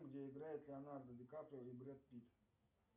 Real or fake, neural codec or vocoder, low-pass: real; none; 3.6 kHz